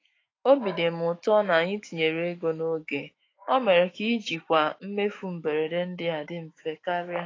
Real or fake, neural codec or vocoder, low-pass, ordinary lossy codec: fake; autoencoder, 48 kHz, 128 numbers a frame, DAC-VAE, trained on Japanese speech; 7.2 kHz; AAC, 32 kbps